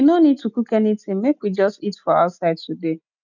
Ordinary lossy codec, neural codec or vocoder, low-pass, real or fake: none; none; 7.2 kHz; real